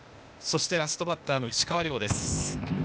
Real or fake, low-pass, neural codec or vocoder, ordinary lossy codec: fake; none; codec, 16 kHz, 0.8 kbps, ZipCodec; none